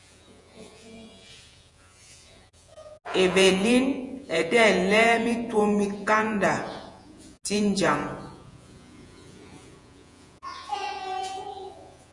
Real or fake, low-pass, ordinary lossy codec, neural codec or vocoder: fake; 10.8 kHz; Opus, 64 kbps; vocoder, 48 kHz, 128 mel bands, Vocos